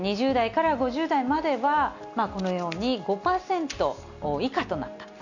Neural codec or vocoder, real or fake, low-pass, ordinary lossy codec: none; real; 7.2 kHz; none